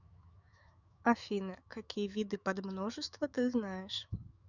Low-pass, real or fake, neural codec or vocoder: 7.2 kHz; fake; autoencoder, 48 kHz, 128 numbers a frame, DAC-VAE, trained on Japanese speech